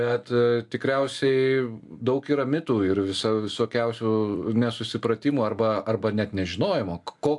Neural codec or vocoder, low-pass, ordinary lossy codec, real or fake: none; 10.8 kHz; AAC, 64 kbps; real